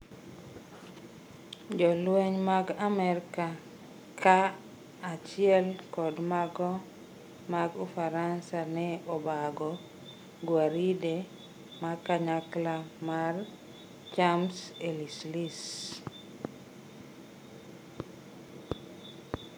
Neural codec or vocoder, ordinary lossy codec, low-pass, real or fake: none; none; none; real